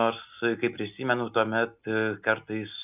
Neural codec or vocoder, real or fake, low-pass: none; real; 3.6 kHz